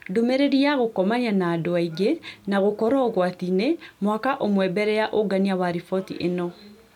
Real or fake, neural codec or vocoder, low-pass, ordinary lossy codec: real; none; 19.8 kHz; none